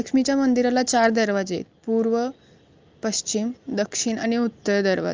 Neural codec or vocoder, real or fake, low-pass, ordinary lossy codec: none; real; 7.2 kHz; Opus, 24 kbps